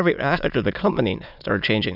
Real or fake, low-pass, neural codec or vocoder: fake; 5.4 kHz; autoencoder, 22.05 kHz, a latent of 192 numbers a frame, VITS, trained on many speakers